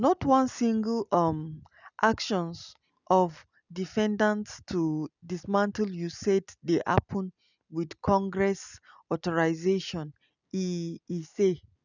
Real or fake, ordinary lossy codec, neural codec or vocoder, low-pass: real; none; none; 7.2 kHz